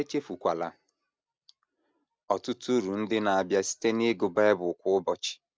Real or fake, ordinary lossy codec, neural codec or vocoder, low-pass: real; none; none; none